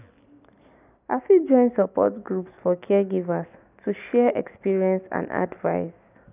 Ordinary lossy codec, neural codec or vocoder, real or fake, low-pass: none; none; real; 3.6 kHz